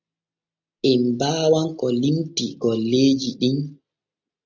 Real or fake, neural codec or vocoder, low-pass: real; none; 7.2 kHz